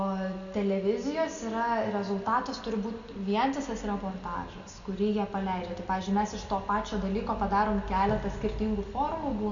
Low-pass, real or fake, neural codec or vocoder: 7.2 kHz; real; none